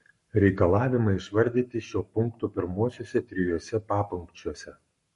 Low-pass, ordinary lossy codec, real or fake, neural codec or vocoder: 14.4 kHz; MP3, 48 kbps; fake; codec, 44.1 kHz, 7.8 kbps, Pupu-Codec